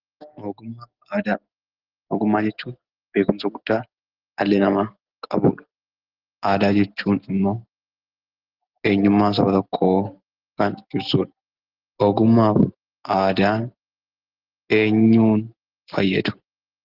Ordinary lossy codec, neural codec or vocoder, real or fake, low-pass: Opus, 16 kbps; none; real; 5.4 kHz